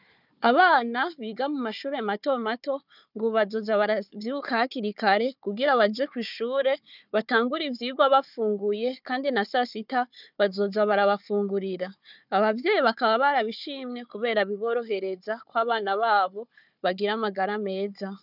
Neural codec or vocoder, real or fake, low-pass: codec, 16 kHz, 4 kbps, FunCodec, trained on Chinese and English, 50 frames a second; fake; 5.4 kHz